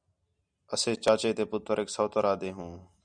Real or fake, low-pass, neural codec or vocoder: real; 9.9 kHz; none